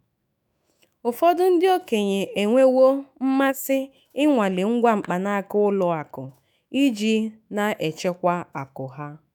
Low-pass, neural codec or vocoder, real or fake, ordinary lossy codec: none; autoencoder, 48 kHz, 128 numbers a frame, DAC-VAE, trained on Japanese speech; fake; none